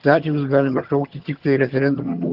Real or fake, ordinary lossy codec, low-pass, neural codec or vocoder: fake; Opus, 16 kbps; 5.4 kHz; vocoder, 22.05 kHz, 80 mel bands, HiFi-GAN